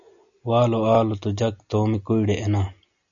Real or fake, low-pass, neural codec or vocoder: real; 7.2 kHz; none